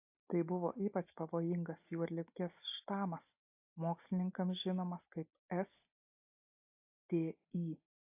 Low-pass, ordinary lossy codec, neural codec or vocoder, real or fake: 3.6 kHz; AAC, 32 kbps; none; real